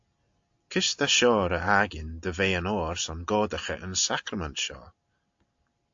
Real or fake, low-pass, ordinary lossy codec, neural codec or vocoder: real; 7.2 kHz; AAC, 64 kbps; none